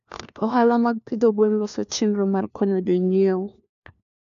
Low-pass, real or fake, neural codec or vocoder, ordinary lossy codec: 7.2 kHz; fake; codec, 16 kHz, 1 kbps, FunCodec, trained on LibriTTS, 50 frames a second; AAC, 96 kbps